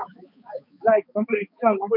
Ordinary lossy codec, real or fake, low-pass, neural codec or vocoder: MP3, 48 kbps; fake; 5.4 kHz; codec, 16 kHz, 4 kbps, X-Codec, HuBERT features, trained on balanced general audio